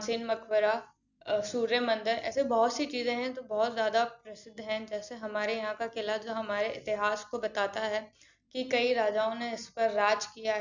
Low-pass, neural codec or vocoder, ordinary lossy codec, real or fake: 7.2 kHz; none; none; real